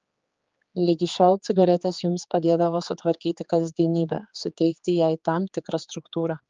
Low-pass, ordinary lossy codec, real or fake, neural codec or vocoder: 7.2 kHz; Opus, 24 kbps; fake; codec, 16 kHz, 4 kbps, X-Codec, HuBERT features, trained on general audio